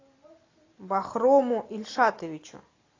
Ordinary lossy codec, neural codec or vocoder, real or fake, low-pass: AAC, 32 kbps; none; real; 7.2 kHz